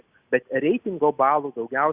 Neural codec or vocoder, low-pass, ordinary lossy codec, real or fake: none; 3.6 kHz; Opus, 64 kbps; real